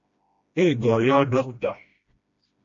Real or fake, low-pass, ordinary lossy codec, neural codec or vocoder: fake; 7.2 kHz; MP3, 48 kbps; codec, 16 kHz, 1 kbps, FreqCodec, smaller model